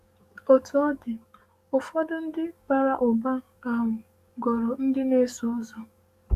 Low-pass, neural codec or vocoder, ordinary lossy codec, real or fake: 14.4 kHz; codec, 44.1 kHz, 7.8 kbps, DAC; Opus, 64 kbps; fake